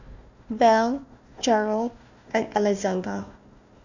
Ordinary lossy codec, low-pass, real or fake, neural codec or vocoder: none; 7.2 kHz; fake; codec, 16 kHz, 1 kbps, FunCodec, trained on Chinese and English, 50 frames a second